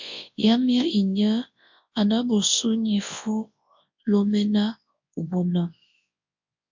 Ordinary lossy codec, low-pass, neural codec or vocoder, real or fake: MP3, 48 kbps; 7.2 kHz; codec, 24 kHz, 0.9 kbps, WavTokenizer, large speech release; fake